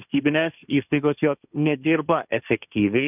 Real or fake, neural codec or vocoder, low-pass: fake; codec, 16 kHz, 1.1 kbps, Voila-Tokenizer; 3.6 kHz